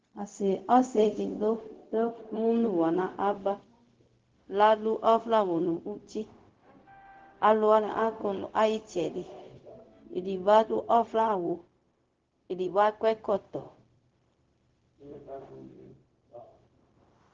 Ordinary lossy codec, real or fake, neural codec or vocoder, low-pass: Opus, 16 kbps; fake; codec, 16 kHz, 0.4 kbps, LongCat-Audio-Codec; 7.2 kHz